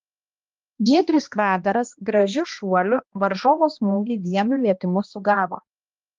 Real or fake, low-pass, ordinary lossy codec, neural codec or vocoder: fake; 7.2 kHz; Opus, 32 kbps; codec, 16 kHz, 1 kbps, X-Codec, HuBERT features, trained on balanced general audio